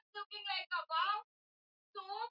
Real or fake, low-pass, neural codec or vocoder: real; 5.4 kHz; none